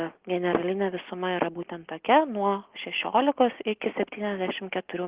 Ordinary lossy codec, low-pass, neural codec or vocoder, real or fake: Opus, 16 kbps; 3.6 kHz; none; real